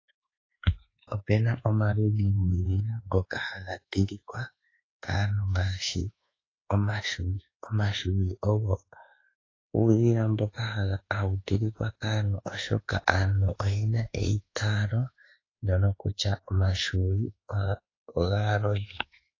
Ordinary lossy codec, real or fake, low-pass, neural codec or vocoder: AAC, 32 kbps; fake; 7.2 kHz; codec, 24 kHz, 1.2 kbps, DualCodec